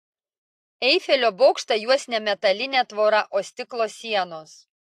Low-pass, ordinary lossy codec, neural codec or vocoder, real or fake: 14.4 kHz; AAC, 64 kbps; none; real